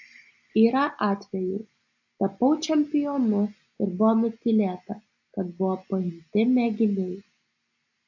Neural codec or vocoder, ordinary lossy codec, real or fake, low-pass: none; AAC, 48 kbps; real; 7.2 kHz